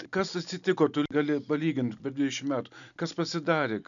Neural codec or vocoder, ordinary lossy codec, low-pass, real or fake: none; MP3, 96 kbps; 7.2 kHz; real